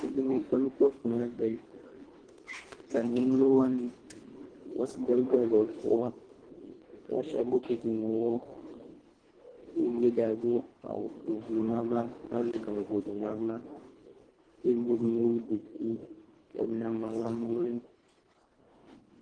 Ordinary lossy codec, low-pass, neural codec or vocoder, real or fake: Opus, 16 kbps; 9.9 kHz; codec, 24 kHz, 1.5 kbps, HILCodec; fake